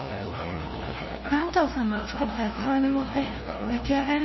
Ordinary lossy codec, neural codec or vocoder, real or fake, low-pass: MP3, 24 kbps; codec, 16 kHz, 0.5 kbps, FunCodec, trained on LibriTTS, 25 frames a second; fake; 7.2 kHz